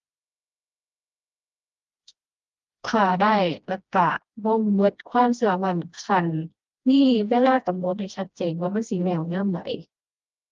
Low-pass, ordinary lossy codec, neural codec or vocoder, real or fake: 7.2 kHz; Opus, 32 kbps; codec, 16 kHz, 1 kbps, FreqCodec, smaller model; fake